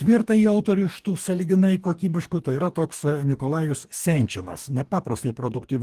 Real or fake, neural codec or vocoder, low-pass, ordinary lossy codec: fake; codec, 44.1 kHz, 2.6 kbps, DAC; 14.4 kHz; Opus, 24 kbps